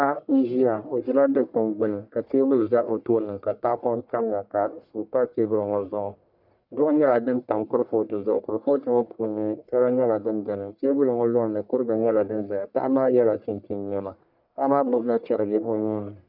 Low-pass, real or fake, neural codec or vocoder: 5.4 kHz; fake; codec, 44.1 kHz, 1.7 kbps, Pupu-Codec